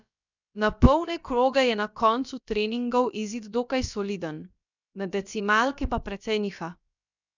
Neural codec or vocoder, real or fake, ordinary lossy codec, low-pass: codec, 16 kHz, about 1 kbps, DyCAST, with the encoder's durations; fake; none; 7.2 kHz